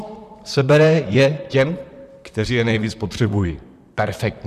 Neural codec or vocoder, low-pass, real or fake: vocoder, 44.1 kHz, 128 mel bands, Pupu-Vocoder; 14.4 kHz; fake